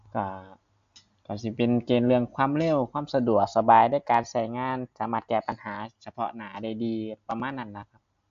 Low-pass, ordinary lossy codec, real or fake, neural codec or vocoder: 7.2 kHz; AAC, 64 kbps; real; none